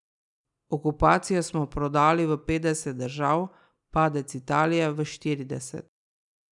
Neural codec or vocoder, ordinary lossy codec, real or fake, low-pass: none; none; real; 10.8 kHz